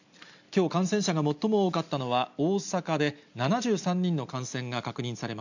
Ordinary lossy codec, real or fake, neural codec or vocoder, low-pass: none; real; none; 7.2 kHz